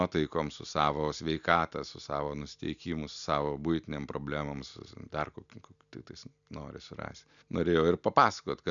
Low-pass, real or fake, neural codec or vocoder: 7.2 kHz; real; none